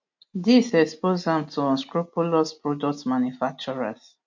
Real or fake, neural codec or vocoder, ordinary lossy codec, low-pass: real; none; MP3, 48 kbps; 7.2 kHz